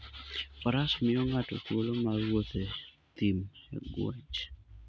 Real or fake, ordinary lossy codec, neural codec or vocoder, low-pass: real; none; none; none